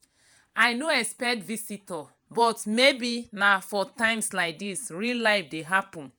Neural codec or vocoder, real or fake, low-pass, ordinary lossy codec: vocoder, 48 kHz, 128 mel bands, Vocos; fake; none; none